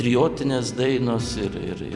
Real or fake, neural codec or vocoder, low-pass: fake; vocoder, 44.1 kHz, 128 mel bands every 256 samples, BigVGAN v2; 10.8 kHz